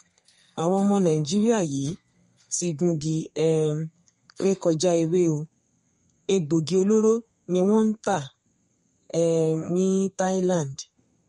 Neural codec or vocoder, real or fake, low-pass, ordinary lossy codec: codec, 32 kHz, 1.9 kbps, SNAC; fake; 14.4 kHz; MP3, 48 kbps